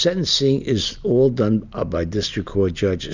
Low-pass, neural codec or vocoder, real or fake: 7.2 kHz; none; real